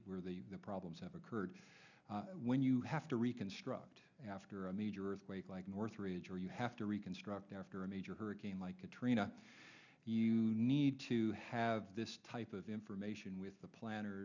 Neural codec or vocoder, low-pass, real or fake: none; 7.2 kHz; real